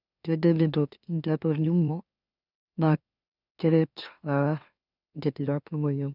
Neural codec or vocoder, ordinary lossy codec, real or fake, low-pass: autoencoder, 44.1 kHz, a latent of 192 numbers a frame, MeloTTS; none; fake; 5.4 kHz